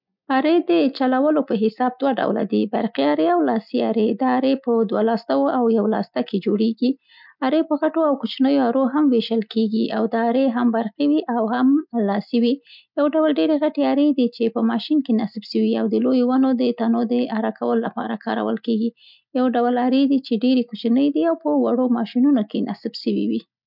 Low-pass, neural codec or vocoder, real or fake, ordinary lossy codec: 5.4 kHz; none; real; none